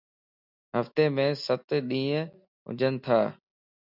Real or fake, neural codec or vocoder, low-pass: real; none; 5.4 kHz